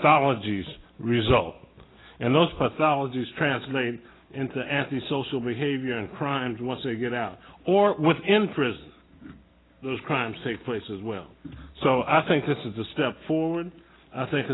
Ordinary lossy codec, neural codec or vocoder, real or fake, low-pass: AAC, 16 kbps; none; real; 7.2 kHz